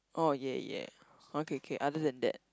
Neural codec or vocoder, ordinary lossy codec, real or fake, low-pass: none; none; real; none